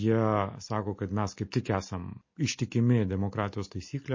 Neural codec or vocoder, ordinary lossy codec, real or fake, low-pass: none; MP3, 32 kbps; real; 7.2 kHz